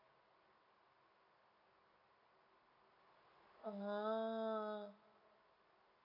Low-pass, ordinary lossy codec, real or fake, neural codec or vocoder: 5.4 kHz; none; real; none